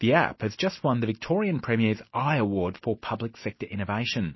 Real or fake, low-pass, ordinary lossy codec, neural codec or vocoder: real; 7.2 kHz; MP3, 24 kbps; none